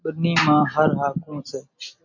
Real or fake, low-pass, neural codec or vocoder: real; 7.2 kHz; none